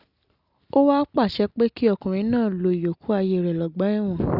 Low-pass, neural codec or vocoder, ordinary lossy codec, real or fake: 5.4 kHz; none; Opus, 64 kbps; real